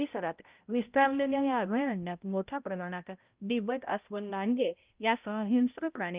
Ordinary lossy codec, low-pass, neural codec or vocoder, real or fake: Opus, 24 kbps; 3.6 kHz; codec, 16 kHz, 0.5 kbps, X-Codec, HuBERT features, trained on balanced general audio; fake